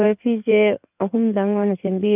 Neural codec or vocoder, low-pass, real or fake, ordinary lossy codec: codec, 16 kHz in and 24 kHz out, 2.2 kbps, FireRedTTS-2 codec; 3.6 kHz; fake; none